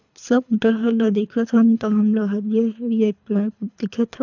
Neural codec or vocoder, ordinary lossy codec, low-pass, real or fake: codec, 24 kHz, 3 kbps, HILCodec; none; 7.2 kHz; fake